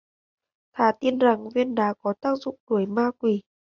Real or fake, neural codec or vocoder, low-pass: real; none; 7.2 kHz